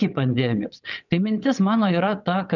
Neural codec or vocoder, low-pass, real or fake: vocoder, 22.05 kHz, 80 mel bands, WaveNeXt; 7.2 kHz; fake